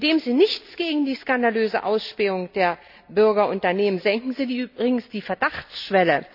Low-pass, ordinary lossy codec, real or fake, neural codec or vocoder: 5.4 kHz; none; real; none